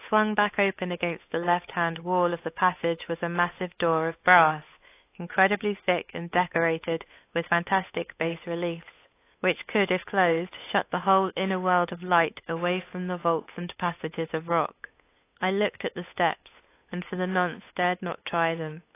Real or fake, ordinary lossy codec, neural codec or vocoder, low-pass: fake; AAC, 24 kbps; codec, 16 kHz, 8 kbps, FunCodec, trained on Chinese and English, 25 frames a second; 3.6 kHz